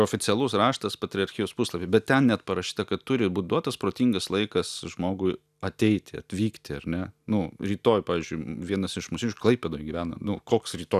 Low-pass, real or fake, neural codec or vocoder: 14.4 kHz; real; none